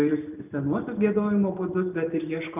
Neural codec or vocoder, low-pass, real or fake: none; 3.6 kHz; real